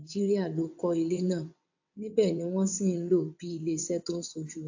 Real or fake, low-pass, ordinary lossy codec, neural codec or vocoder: fake; 7.2 kHz; AAC, 48 kbps; vocoder, 22.05 kHz, 80 mel bands, WaveNeXt